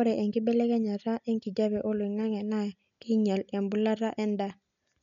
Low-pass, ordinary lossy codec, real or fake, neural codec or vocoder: 7.2 kHz; none; real; none